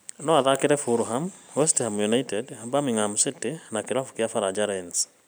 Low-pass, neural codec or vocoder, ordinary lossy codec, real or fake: none; none; none; real